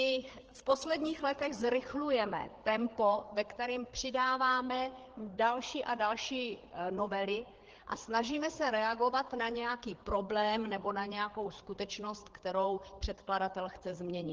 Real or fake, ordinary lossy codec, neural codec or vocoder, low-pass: fake; Opus, 16 kbps; codec, 16 kHz, 4 kbps, FreqCodec, larger model; 7.2 kHz